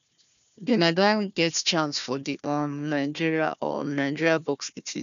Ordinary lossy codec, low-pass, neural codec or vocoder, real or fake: none; 7.2 kHz; codec, 16 kHz, 1 kbps, FunCodec, trained on Chinese and English, 50 frames a second; fake